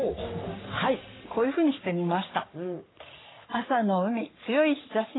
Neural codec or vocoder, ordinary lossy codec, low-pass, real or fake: codec, 44.1 kHz, 3.4 kbps, Pupu-Codec; AAC, 16 kbps; 7.2 kHz; fake